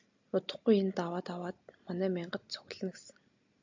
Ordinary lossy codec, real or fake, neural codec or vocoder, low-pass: AAC, 48 kbps; real; none; 7.2 kHz